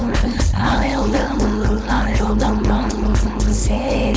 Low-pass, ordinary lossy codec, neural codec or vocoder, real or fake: none; none; codec, 16 kHz, 4.8 kbps, FACodec; fake